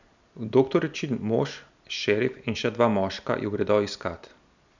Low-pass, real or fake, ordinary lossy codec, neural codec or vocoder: 7.2 kHz; real; none; none